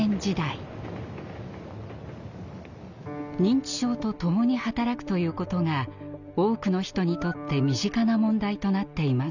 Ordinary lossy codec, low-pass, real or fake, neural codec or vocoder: none; 7.2 kHz; real; none